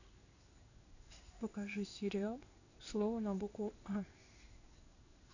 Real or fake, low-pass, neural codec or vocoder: fake; 7.2 kHz; codec, 16 kHz in and 24 kHz out, 1 kbps, XY-Tokenizer